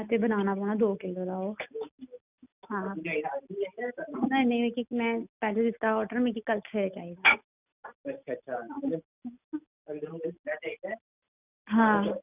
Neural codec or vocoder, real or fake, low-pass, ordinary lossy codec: none; real; 3.6 kHz; none